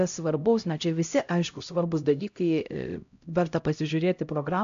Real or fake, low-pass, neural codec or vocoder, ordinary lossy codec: fake; 7.2 kHz; codec, 16 kHz, 0.5 kbps, X-Codec, HuBERT features, trained on LibriSpeech; AAC, 64 kbps